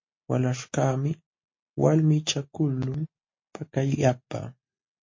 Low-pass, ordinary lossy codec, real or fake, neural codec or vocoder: 7.2 kHz; MP3, 32 kbps; real; none